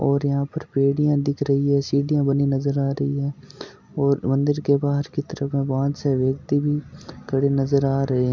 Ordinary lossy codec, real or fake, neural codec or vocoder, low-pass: none; real; none; 7.2 kHz